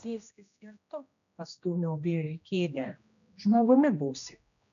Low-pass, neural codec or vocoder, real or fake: 7.2 kHz; codec, 16 kHz, 1 kbps, X-Codec, HuBERT features, trained on general audio; fake